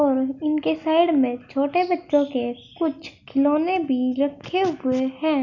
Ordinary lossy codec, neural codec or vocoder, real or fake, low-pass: none; none; real; 7.2 kHz